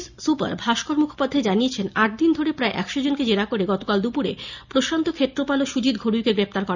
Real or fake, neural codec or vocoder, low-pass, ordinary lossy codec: real; none; 7.2 kHz; none